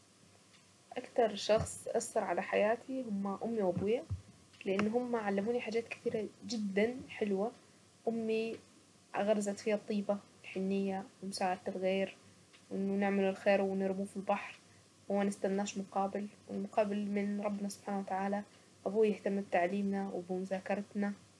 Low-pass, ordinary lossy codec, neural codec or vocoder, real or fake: none; none; none; real